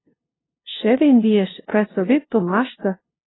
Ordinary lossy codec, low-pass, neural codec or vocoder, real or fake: AAC, 16 kbps; 7.2 kHz; codec, 16 kHz, 0.5 kbps, FunCodec, trained on LibriTTS, 25 frames a second; fake